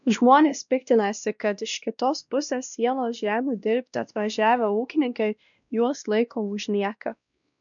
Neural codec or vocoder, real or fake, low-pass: codec, 16 kHz, 1 kbps, X-Codec, WavLM features, trained on Multilingual LibriSpeech; fake; 7.2 kHz